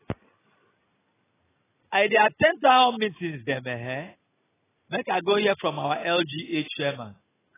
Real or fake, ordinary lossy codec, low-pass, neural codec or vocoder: real; AAC, 16 kbps; 3.6 kHz; none